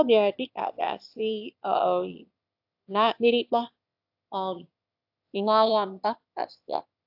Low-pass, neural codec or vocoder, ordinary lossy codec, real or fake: 5.4 kHz; autoencoder, 22.05 kHz, a latent of 192 numbers a frame, VITS, trained on one speaker; AAC, 48 kbps; fake